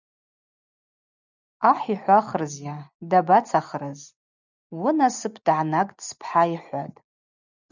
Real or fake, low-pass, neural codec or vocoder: real; 7.2 kHz; none